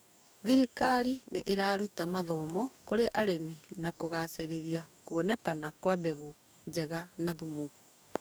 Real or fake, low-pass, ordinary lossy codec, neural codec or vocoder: fake; none; none; codec, 44.1 kHz, 2.6 kbps, DAC